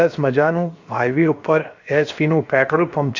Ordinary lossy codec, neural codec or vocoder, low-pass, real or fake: AAC, 48 kbps; codec, 16 kHz, 0.7 kbps, FocalCodec; 7.2 kHz; fake